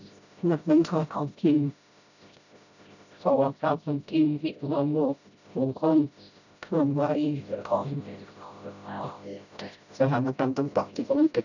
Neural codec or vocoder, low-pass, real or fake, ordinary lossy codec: codec, 16 kHz, 0.5 kbps, FreqCodec, smaller model; 7.2 kHz; fake; none